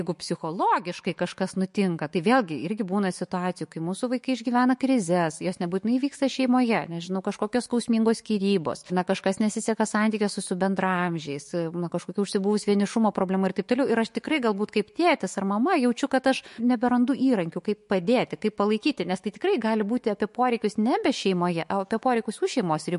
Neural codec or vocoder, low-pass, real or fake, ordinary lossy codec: autoencoder, 48 kHz, 128 numbers a frame, DAC-VAE, trained on Japanese speech; 14.4 kHz; fake; MP3, 48 kbps